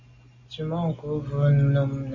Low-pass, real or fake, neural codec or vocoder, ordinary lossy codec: 7.2 kHz; real; none; MP3, 32 kbps